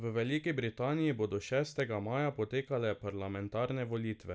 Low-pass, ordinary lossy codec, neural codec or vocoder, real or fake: none; none; none; real